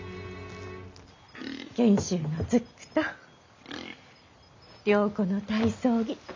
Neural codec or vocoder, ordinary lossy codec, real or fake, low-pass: none; MP3, 64 kbps; real; 7.2 kHz